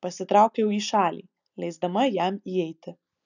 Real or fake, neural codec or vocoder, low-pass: real; none; 7.2 kHz